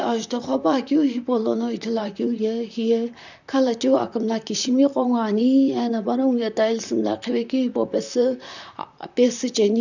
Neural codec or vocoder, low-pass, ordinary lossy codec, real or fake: vocoder, 44.1 kHz, 128 mel bands, Pupu-Vocoder; 7.2 kHz; none; fake